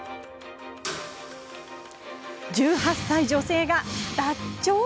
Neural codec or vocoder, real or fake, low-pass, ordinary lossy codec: none; real; none; none